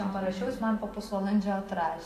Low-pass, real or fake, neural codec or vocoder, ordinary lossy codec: 14.4 kHz; real; none; AAC, 64 kbps